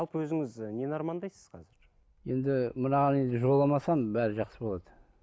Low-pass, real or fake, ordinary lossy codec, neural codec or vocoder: none; real; none; none